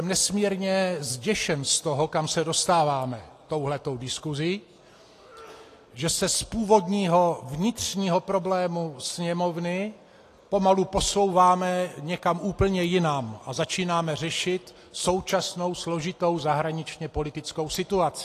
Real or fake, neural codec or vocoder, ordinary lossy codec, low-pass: real; none; AAC, 48 kbps; 14.4 kHz